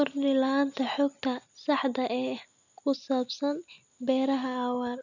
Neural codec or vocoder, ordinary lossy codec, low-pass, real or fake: none; none; 7.2 kHz; real